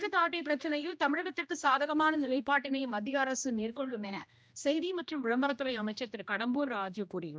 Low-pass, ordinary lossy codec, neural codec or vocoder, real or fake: none; none; codec, 16 kHz, 1 kbps, X-Codec, HuBERT features, trained on general audio; fake